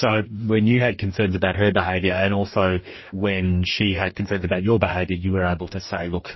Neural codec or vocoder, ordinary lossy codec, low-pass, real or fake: codec, 44.1 kHz, 2.6 kbps, DAC; MP3, 24 kbps; 7.2 kHz; fake